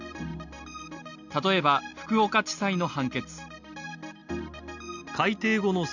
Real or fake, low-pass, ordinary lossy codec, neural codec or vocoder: real; 7.2 kHz; none; none